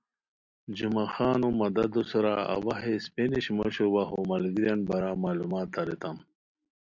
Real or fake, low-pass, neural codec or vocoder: real; 7.2 kHz; none